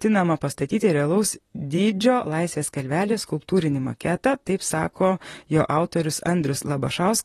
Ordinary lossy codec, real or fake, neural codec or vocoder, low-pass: AAC, 32 kbps; fake; vocoder, 44.1 kHz, 128 mel bands, Pupu-Vocoder; 19.8 kHz